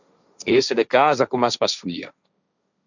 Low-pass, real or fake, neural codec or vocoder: 7.2 kHz; fake; codec, 16 kHz, 1.1 kbps, Voila-Tokenizer